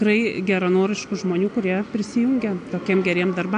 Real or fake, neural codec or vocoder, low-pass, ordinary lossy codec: real; none; 9.9 kHz; AAC, 64 kbps